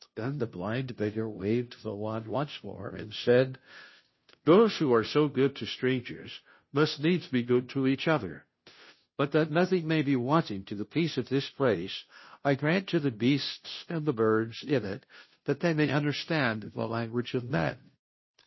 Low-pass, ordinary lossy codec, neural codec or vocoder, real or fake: 7.2 kHz; MP3, 24 kbps; codec, 16 kHz, 0.5 kbps, FunCodec, trained on Chinese and English, 25 frames a second; fake